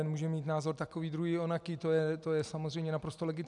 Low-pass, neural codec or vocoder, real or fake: 10.8 kHz; none; real